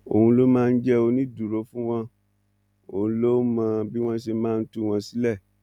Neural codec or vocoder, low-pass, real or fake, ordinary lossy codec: none; 19.8 kHz; real; none